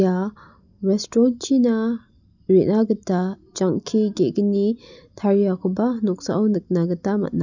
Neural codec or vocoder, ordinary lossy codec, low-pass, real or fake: none; none; 7.2 kHz; real